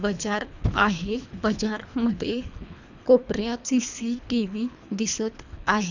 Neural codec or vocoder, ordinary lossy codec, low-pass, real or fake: codec, 24 kHz, 3 kbps, HILCodec; none; 7.2 kHz; fake